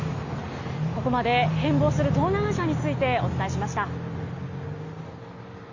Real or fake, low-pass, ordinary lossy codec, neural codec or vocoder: real; 7.2 kHz; none; none